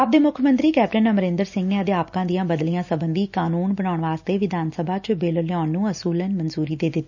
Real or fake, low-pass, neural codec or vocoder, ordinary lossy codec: real; 7.2 kHz; none; none